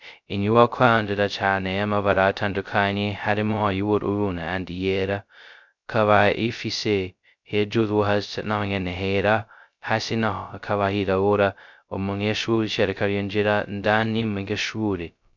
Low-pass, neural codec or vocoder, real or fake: 7.2 kHz; codec, 16 kHz, 0.2 kbps, FocalCodec; fake